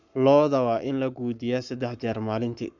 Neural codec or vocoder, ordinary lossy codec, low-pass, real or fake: codec, 44.1 kHz, 7.8 kbps, Pupu-Codec; none; 7.2 kHz; fake